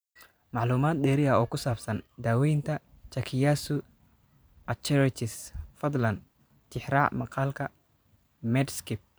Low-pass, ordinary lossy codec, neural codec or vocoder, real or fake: none; none; vocoder, 44.1 kHz, 128 mel bands every 512 samples, BigVGAN v2; fake